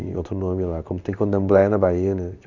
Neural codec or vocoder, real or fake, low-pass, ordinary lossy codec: codec, 16 kHz in and 24 kHz out, 1 kbps, XY-Tokenizer; fake; 7.2 kHz; none